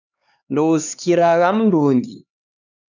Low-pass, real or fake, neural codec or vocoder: 7.2 kHz; fake; codec, 16 kHz, 2 kbps, X-Codec, HuBERT features, trained on LibriSpeech